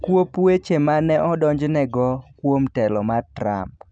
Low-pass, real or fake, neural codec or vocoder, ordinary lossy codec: 9.9 kHz; real; none; none